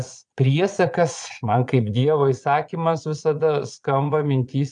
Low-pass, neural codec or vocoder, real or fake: 9.9 kHz; vocoder, 22.05 kHz, 80 mel bands, Vocos; fake